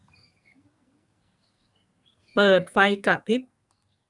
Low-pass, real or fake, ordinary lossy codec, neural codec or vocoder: 10.8 kHz; fake; none; codec, 44.1 kHz, 2.6 kbps, SNAC